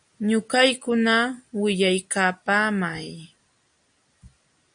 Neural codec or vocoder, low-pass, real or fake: none; 9.9 kHz; real